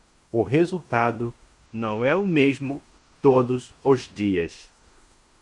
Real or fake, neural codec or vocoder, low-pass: fake; codec, 16 kHz in and 24 kHz out, 0.9 kbps, LongCat-Audio-Codec, fine tuned four codebook decoder; 10.8 kHz